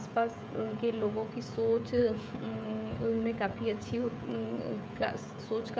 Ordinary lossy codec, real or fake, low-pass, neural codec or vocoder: none; fake; none; codec, 16 kHz, 16 kbps, FreqCodec, smaller model